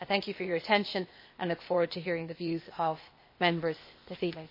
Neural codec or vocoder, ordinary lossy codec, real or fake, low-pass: codec, 16 kHz, 0.8 kbps, ZipCodec; MP3, 24 kbps; fake; 5.4 kHz